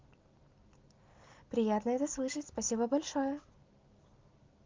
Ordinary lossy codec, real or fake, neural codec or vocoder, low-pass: Opus, 24 kbps; real; none; 7.2 kHz